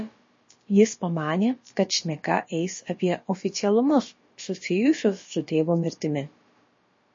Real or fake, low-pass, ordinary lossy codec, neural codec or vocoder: fake; 7.2 kHz; MP3, 32 kbps; codec, 16 kHz, about 1 kbps, DyCAST, with the encoder's durations